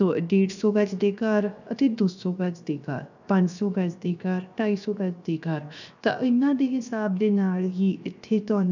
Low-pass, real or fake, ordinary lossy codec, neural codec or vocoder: 7.2 kHz; fake; none; codec, 16 kHz, 0.7 kbps, FocalCodec